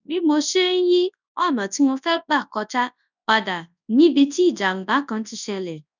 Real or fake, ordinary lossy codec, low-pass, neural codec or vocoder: fake; none; 7.2 kHz; codec, 24 kHz, 0.9 kbps, WavTokenizer, large speech release